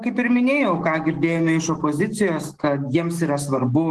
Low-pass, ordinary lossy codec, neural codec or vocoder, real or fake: 10.8 kHz; Opus, 16 kbps; autoencoder, 48 kHz, 128 numbers a frame, DAC-VAE, trained on Japanese speech; fake